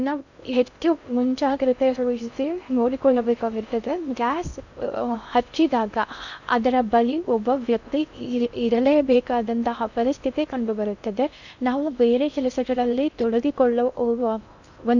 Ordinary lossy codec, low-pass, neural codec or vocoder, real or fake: none; 7.2 kHz; codec, 16 kHz in and 24 kHz out, 0.6 kbps, FocalCodec, streaming, 2048 codes; fake